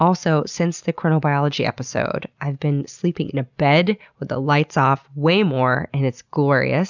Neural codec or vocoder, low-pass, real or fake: none; 7.2 kHz; real